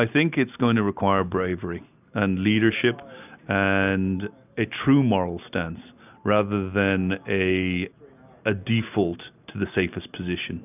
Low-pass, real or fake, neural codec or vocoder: 3.6 kHz; real; none